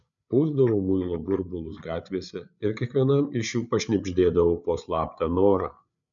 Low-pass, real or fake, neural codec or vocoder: 7.2 kHz; fake; codec, 16 kHz, 8 kbps, FreqCodec, larger model